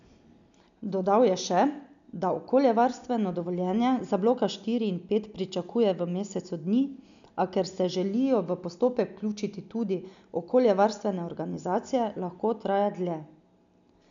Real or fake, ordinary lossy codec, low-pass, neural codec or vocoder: real; none; 7.2 kHz; none